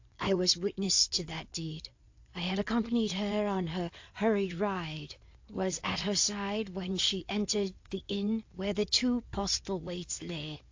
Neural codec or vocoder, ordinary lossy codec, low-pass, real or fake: codec, 16 kHz in and 24 kHz out, 2.2 kbps, FireRedTTS-2 codec; AAC, 48 kbps; 7.2 kHz; fake